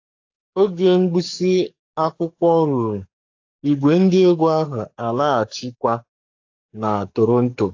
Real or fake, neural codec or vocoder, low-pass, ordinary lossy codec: fake; codec, 44.1 kHz, 7.8 kbps, DAC; 7.2 kHz; AAC, 48 kbps